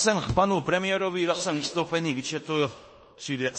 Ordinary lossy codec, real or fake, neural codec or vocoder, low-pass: MP3, 32 kbps; fake; codec, 16 kHz in and 24 kHz out, 0.9 kbps, LongCat-Audio-Codec, fine tuned four codebook decoder; 9.9 kHz